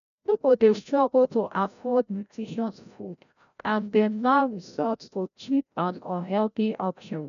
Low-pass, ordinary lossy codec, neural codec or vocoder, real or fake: 7.2 kHz; none; codec, 16 kHz, 0.5 kbps, FreqCodec, larger model; fake